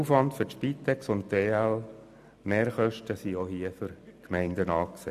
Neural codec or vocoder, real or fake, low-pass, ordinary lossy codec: none; real; 14.4 kHz; none